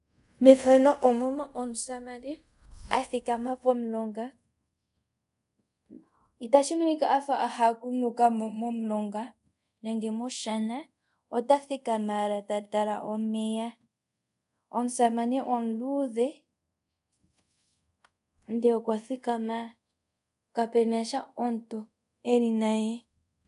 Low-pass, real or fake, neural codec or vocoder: 10.8 kHz; fake; codec, 24 kHz, 0.5 kbps, DualCodec